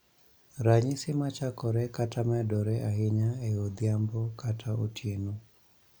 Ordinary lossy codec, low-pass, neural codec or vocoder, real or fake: none; none; none; real